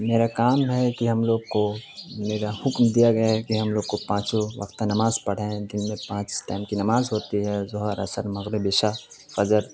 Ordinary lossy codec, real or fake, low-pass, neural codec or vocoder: none; real; none; none